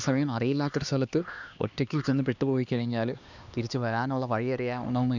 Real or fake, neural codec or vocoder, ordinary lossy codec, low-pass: fake; codec, 16 kHz, 2 kbps, X-Codec, HuBERT features, trained on LibriSpeech; none; 7.2 kHz